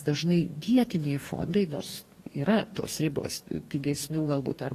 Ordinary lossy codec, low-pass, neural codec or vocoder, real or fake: AAC, 48 kbps; 14.4 kHz; codec, 44.1 kHz, 2.6 kbps, DAC; fake